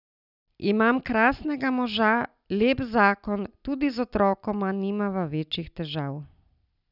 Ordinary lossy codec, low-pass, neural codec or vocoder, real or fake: none; 5.4 kHz; none; real